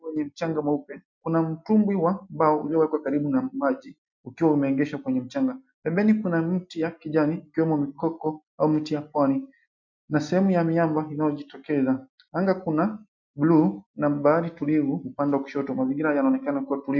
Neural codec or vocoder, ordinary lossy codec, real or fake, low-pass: none; MP3, 64 kbps; real; 7.2 kHz